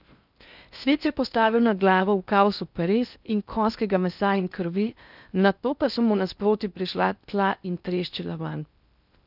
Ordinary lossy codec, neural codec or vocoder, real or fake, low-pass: none; codec, 16 kHz in and 24 kHz out, 0.6 kbps, FocalCodec, streaming, 4096 codes; fake; 5.4 kHz